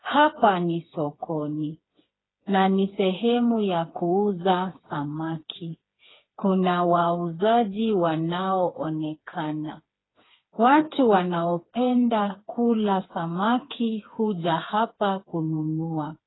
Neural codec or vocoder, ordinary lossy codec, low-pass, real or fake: codec, 16 kHz, 4 kbps, FreqCodec, smaller model; AAC, 16 kbps; 7.2 kHz; fake